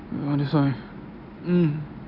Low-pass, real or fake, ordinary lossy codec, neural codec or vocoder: 5.4 kHz; real; none; none